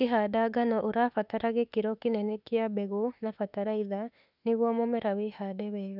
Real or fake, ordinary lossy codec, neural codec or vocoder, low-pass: fake; none; autoencoder, 48 kHz, 32 numbers a frame, DAC-VAE, trained on Japanese speech; 5.4 kHz